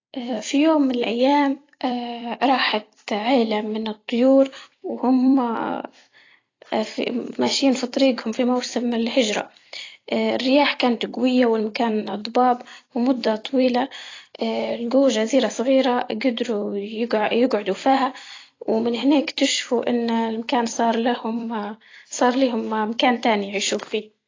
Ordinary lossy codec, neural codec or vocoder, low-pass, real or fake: AAC, 32 kbps; vocoder, 44.1 kHz, 128 mel bands every 256 samples, BigVGAN v2; 7.2 kHz; fake